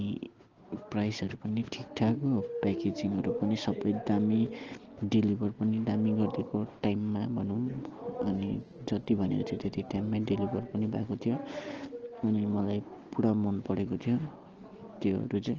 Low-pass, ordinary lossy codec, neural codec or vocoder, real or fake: 7.2 kHz; Opus, 16 kbps; none; real